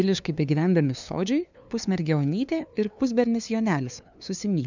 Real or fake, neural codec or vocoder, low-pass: fake; codec, 16 kHz, 2 kbps, FunCodec, trained on LibriTTS, 25 frames a second; 7.2 kHz